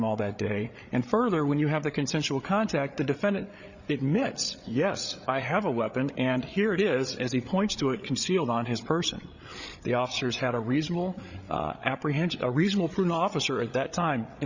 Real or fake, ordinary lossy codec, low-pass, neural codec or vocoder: fake; Opus, 64 kbps; 7.2 kHz; codec, 16 kHz, 8 kbps, FreqCodec, larger model